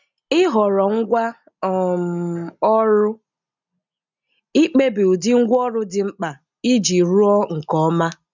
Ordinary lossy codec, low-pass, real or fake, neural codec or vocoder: none; 7.2 kHz; real; none